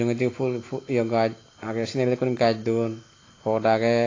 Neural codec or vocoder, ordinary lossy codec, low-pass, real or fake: none; AAC, 32 kbps; 7.2 kHz; real